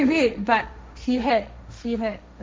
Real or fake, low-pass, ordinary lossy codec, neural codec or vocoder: fake; none; none; codec, 16 kHz, 1.1 kbps, Voila-Tokenizer